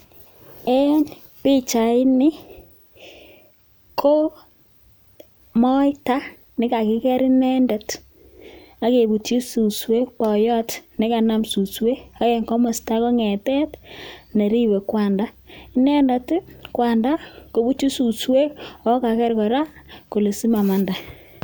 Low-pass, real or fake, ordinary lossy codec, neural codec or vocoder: none; real; none; none